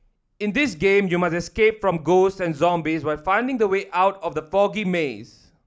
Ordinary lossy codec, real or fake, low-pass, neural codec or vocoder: none; real; none; none